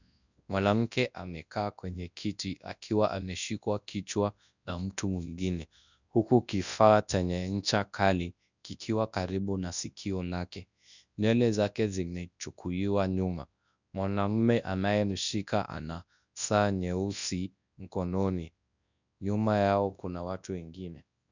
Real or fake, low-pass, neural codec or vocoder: fake; 7.2 kHz; codec, 24 kHz, 0.9 kbps, WavTokenizer, large speech release